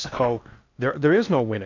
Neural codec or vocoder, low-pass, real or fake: codec, 16 kHz in and 24 kHz out, 0.8 kbps, FocalCodec, streaming, 65536 codes; 7.2 kHz; fake